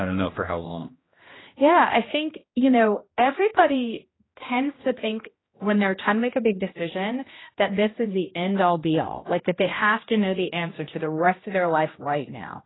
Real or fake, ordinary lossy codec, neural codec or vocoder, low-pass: fake; AAC, 16 kbps; codec, 16 kHz, 1 kbps, X-Codec, HuBERT features, trained on general audio; 7.2 kHz